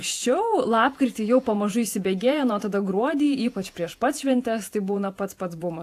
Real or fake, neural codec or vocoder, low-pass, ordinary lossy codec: fake; vocoder, 48 kHz, 128 mel bands, Vocos; 14.4 kHz; AAC, 64 kbps